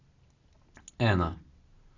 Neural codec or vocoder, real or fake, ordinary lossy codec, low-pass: none; real; AAC, 32 kbps; 7.2 kHz